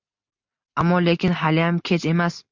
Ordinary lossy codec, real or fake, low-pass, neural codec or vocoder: MP3, 64 kbps; real; 7.2 kHz; none